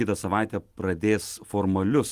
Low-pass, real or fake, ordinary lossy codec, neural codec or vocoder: 14.4 kHz; real; Opus, 24 kbps; none